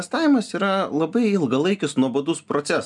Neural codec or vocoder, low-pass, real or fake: none; 10.8 kHz; real